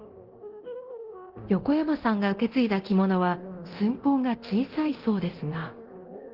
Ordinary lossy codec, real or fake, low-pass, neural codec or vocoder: Opus, 16 kbps; fake; 5.4 kHz; codec, 24 kHz, 0.9 kbps, DualCodec